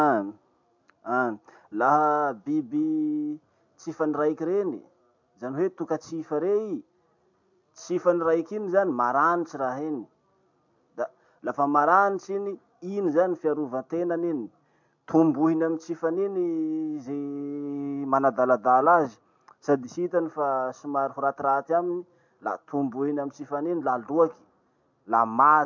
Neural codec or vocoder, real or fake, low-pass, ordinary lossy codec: none; real; 7.2 kHz; MP3, 48 kbps